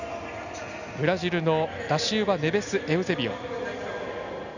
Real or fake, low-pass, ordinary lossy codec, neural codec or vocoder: real; 7.2 kHz; none; none